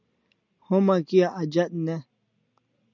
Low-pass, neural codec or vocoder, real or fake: 7.2 kHz; none; real